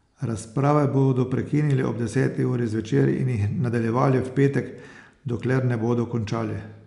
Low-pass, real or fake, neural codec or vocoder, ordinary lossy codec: 10.8 kHz; real; none; none